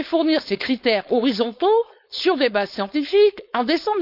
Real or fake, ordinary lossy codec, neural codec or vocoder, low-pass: fake; none; codec, 16 kHz, 4.8 kbps, FACodec; 5.4 kHz